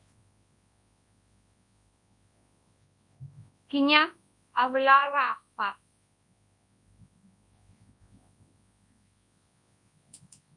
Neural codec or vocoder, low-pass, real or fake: codec, 24 kHz, 0.9 kbps, WavTokenizer, large speech release; 10.8 kHz; fake